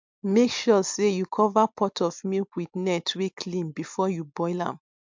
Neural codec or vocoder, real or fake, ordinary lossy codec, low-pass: none; real; MP3, 64 kbps; 7.2 kHz